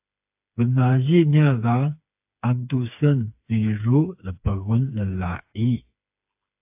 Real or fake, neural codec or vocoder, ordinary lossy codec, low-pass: fake; codec, 16 kHz, 4 kbps, FreqCodec, smaller model; AAC, 32 kbps; 3.6 kHz